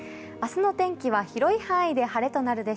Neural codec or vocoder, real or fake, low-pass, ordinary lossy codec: none; real; none; none